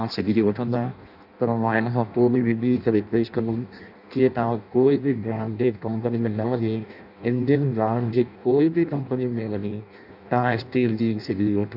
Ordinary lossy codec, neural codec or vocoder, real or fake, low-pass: none; codec, 16 kHz in and 24 kHz out, 0.6 kbps, FireRedTTS-2 codec; fake; 5.4 kHz